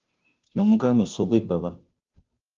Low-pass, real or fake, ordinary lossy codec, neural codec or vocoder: 7.2 kHz; fake; Opus, 32 kbps; codec, 16 kHz, 0.5 kbps, FunCodec, trained on Chinese and English, 25 frames a second